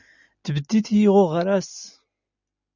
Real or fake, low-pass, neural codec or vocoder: real; 7.2 kHz; none